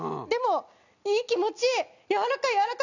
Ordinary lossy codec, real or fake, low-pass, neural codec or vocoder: none; real; 7.2 kHz; none